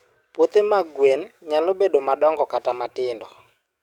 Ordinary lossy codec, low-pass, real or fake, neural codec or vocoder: none; 19.8 kHz; fake; codec, 44.1 kHz, 7.8 kbps, DAC